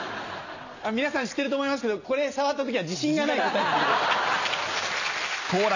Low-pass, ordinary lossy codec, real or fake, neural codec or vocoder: 7.2 kHz; none; real; none